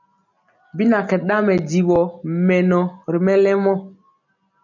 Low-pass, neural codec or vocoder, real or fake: 7.2 kHz; none; real